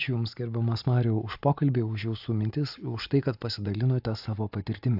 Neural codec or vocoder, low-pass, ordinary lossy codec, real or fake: none; 5.4 kHz; AAC, 48 kbps; real